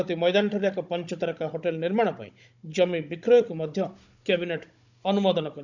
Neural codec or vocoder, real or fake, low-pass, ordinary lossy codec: codec, 16 kHz, 16 kbps, FunCodec, trained on Chinese and English, 50 frames a second; fake; 7.2 kHz; none